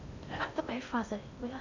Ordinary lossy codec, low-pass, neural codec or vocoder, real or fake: none; 7.2 kHz; codec, 16 kHz in and 24 kHz out, 0.8 kbps, FocalCodec, streaming, 65536 codes; fake